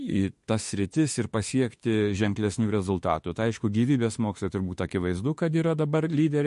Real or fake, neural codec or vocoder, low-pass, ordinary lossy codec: fake; autoencoder, 48 kHz, 32 numbers a frame, DAC-VAE, trained on Japanese speech; 14.4 kHz; MP3, 48 kbps